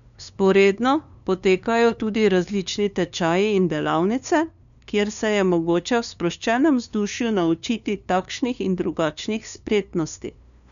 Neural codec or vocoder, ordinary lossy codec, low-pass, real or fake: codec, 16 kHz, 2 kbps, FunCodec, trained on LibriTTS, 25 frames a second; none; 7.2 kHz; fake